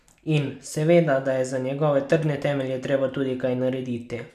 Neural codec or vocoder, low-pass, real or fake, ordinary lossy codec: none; 14.4 kHz; real; none